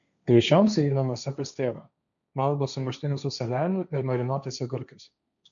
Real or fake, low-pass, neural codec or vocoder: fake; 7.2 kHz; codec, 16 kHz, 1.1 kbps, Voila-Tokenizer